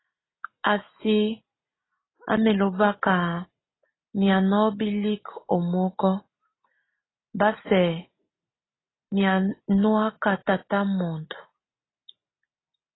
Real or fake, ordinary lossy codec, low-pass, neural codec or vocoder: real; AAC, 16 kbps; 7.2 kHz; none